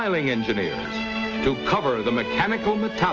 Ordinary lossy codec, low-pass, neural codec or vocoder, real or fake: Opus, 24 kbps; 7.2 kHz; none; real